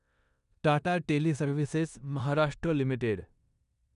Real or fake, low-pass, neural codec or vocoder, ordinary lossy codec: fake; 10.8 kHz; codec, 16 kHz in and 24 kHz out, 0.9 kbps, LongCat-Audio-Codec, four codebook decoder; none